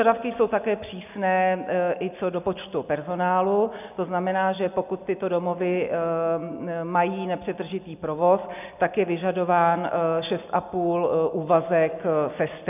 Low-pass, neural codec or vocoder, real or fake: 3.6 kHz; none; real